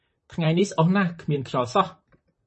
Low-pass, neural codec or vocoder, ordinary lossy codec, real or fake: 10.8 kHz; vocoder, 44.1 kHz, 128 mel bands, Pupu-Vocoder; MP3, 32 kbps; fake